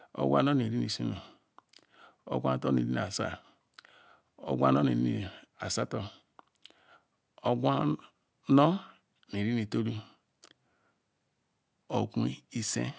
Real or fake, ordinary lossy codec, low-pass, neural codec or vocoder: real; none; none; none